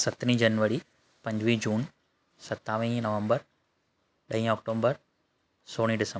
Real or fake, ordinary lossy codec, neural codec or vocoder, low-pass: real; none; none; none